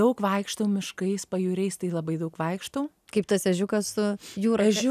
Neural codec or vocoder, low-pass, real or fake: none; 14.4 kHz; real